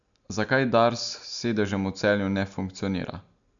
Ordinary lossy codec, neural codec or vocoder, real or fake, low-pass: none; none; real; 7.2 kHz